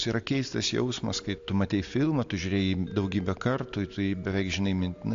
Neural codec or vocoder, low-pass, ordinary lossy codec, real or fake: none; 7.2 kHz; AAC, 64 kbps; real